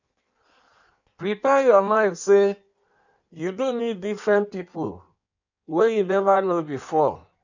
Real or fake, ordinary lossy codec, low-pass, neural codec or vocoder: fake; none; 7.2 kHz; codec, 16 kHz in and 24 kHz out, 1.1 kbps, FireRedTTS-2 codec